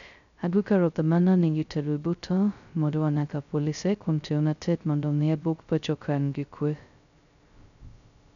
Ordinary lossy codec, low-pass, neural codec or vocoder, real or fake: none; 7.2 kHz; codec, 16 kHz, 0.2 kbps, FocalCodec; fake